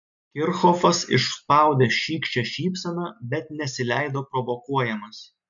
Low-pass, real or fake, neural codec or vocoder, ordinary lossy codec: 7.2 kHz; real; none; MP3, 64 kbps